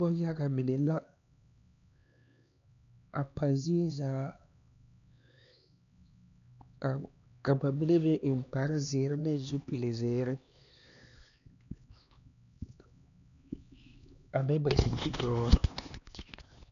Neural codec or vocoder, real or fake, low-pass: codec, 16 kHz, 2 kbps, X-Codec, HuBERT features, trained on LibriSpeech; fake; 7.2 kHz